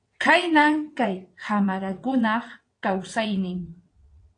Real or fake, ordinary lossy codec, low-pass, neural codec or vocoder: fake; AAC, 48 kbps; 9.9 kHz; vocoder, 22.05 kHz, 80 mel bands, WaveNeXt